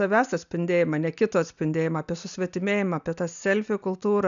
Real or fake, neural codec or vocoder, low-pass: real; none; 7.2 kHz